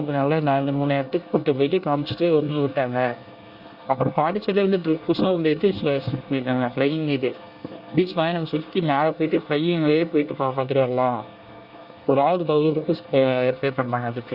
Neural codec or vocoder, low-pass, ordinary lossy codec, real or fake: codec, 24 kHz, 1 kbps, SNAC; 5.4 kHz; Opus, 64 kbps; fake